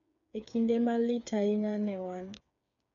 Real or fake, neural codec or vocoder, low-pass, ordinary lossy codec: fake; codec, 16 kHz, 8 kbps, FreqCodec, smaller model; 7.2 kHz; none